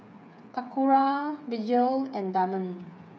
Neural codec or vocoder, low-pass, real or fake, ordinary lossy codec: codec, 16 kHz, 8 kbps, FreqCodec, smaller model; none; fake; none